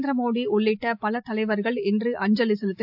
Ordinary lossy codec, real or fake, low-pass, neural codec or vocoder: AAC, 48 kbps; real; 5.4 kHz; none